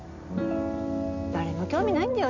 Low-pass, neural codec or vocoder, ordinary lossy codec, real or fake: 7.2 kHz; none; none; real